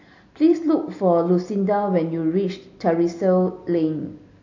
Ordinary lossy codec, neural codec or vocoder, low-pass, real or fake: AAC, 48 kbps; none; 7.2 kHz; real